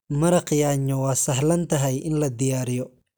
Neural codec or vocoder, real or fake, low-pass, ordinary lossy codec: none; real; none; none